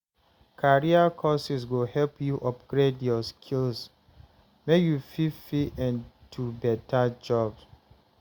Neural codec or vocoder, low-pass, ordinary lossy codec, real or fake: none; 19.8 kHz; Opus, 64 kbps; real